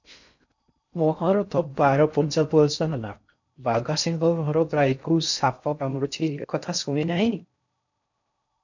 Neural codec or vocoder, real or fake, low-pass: codec, 16 kHz in and 24 kHz out, 0.6 kbps, FocalCodec, streaming, 4096 codes; fake; 7.2 kHz